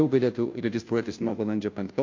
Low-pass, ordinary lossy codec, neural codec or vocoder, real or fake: 7.2 kHz; MP3, 48 kbps; codec, 16 kHz, 0.5 kbps, FunCodec, trained on Chinese and English, 25 frames a second; fake